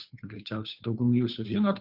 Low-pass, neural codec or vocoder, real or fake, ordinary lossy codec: 5.4 kHz; codec, 16 kHz, 2 kbps, FunCodec, trained on Chinese and English, 25 frames a second; fake; Opus, 64 kbps